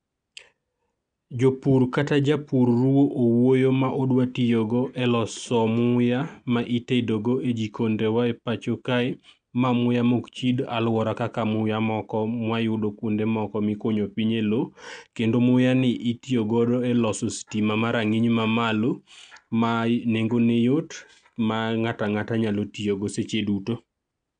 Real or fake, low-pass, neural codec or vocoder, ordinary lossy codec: real; 9.9 kHz; none; none